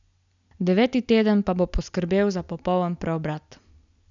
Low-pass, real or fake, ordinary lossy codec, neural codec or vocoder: 7.2 kHz; real; none; none